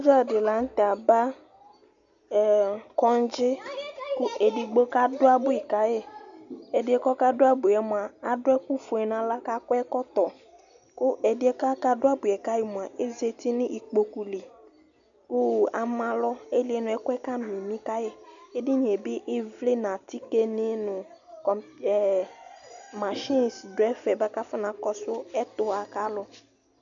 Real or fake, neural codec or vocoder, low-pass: real; none; 7.2 kHz